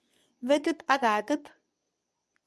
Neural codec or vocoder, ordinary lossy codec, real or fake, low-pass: codec, 24 kHz, 0.9 kbps, WavTokenizer, medium speech release version 2; none; fake; none